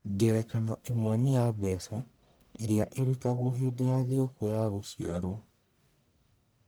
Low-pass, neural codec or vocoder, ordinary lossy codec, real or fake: none; codec, 44.1 kHz, 1.7 kbps, Pupu-Codec; none; fake